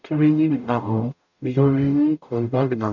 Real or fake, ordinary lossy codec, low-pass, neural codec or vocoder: fake; none; 7.2 kHz; codec, 44.1 kHz, 0.9 kbps, DAC